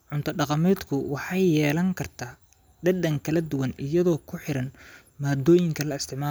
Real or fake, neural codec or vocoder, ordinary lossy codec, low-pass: real; none; none; none